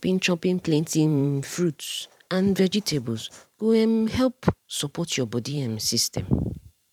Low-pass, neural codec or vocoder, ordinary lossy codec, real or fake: 19.8 kHz; vocoder, 44.1 kHz, 128 mel bands every 512 samples, BigVGAN v2; none; fake